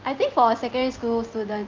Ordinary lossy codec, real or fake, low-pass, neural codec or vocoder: Opus, 24 kbps; real; 7.2 kHz; none